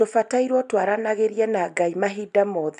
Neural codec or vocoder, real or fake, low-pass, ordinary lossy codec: none; real; 10.8 kHz; none